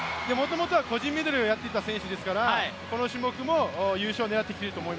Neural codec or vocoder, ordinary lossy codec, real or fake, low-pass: none; none; real; none